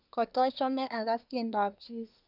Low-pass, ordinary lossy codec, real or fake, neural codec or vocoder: 5.4 kHz; none; fake; codec, 24 kHz, 1 kbps, SNAC